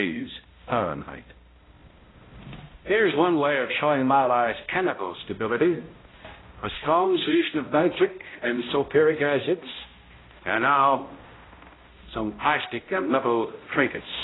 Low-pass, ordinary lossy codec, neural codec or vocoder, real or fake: 7.2 kHz; AAC, 16 kbps; codec, 16 kHz, 0.5 kbps, X-Codec, HuBERT features, trained on balanced general audio; fake